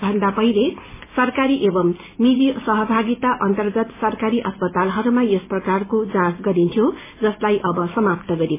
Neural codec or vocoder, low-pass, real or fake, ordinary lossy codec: none; 3.6 kHz; real; MP3, 16 kbps